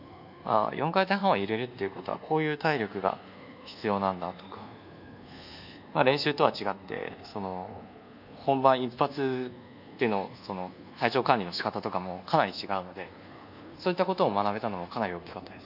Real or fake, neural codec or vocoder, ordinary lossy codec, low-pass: fake; codec, 24 kHz, 1.2 kbps, DualCodec; none; 5.4 kHz